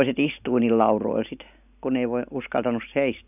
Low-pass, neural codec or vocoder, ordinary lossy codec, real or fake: 3.6 kHz; none; none; real